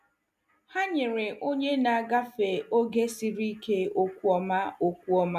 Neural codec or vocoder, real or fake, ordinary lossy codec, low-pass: none; real; MP3, 96 kbps; 14.4 kHz